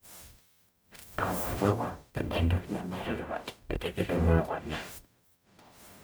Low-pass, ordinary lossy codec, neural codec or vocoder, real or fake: none; none; codec, 44.1 kHz, 0.9 kbps, DAC; fake